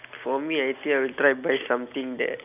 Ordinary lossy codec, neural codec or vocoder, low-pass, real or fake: none; none; 3.6 kHz; real